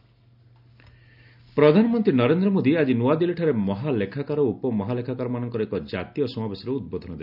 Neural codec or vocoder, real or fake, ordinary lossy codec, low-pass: none; real; none; 5.4 kHz